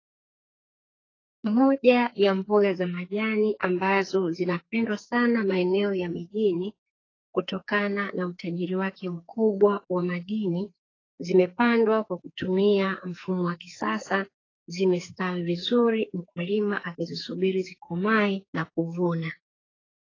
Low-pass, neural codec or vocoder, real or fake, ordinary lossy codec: 7.2 kHz; codec, 44.1 kHz, 2.6 kbps, SNAC; fake; AAC, 32 kbps